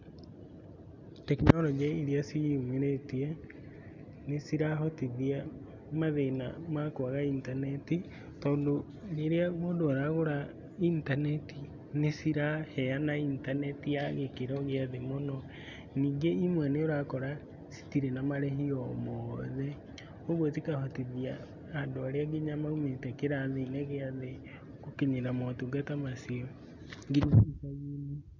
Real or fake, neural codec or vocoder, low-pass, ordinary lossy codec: real; none; 7.2 kHz; none